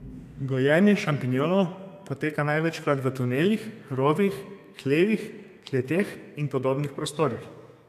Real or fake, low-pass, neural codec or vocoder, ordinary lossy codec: fake; 14.4 kHz; codec, 32 kHz, 1.9 kbps, SNAC; none